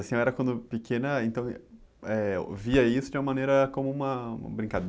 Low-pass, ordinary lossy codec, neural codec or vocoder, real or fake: none; none; none; real